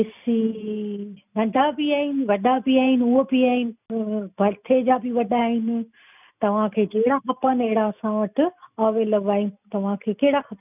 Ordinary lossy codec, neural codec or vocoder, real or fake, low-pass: none; none; real; 3.6 kHz